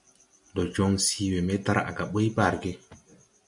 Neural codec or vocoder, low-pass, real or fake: none; 10.8 kHz; real